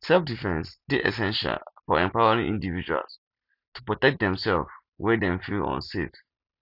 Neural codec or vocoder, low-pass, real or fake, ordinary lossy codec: none; 5.4 kHz; real; none